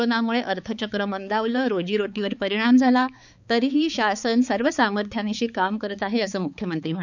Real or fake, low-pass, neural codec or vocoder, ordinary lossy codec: fake; 7.2 kHz; codec, 16 kHz, 4 kbps, X-Codec, HuBERT features, trained on balanced general audio; none